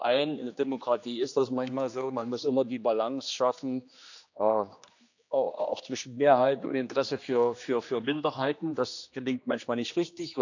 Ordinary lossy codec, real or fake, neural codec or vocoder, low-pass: none; fake; codec, 16 kHz, 2 kbps, X-Codec, HuBERT features, trained on general audio; 7.2 kHz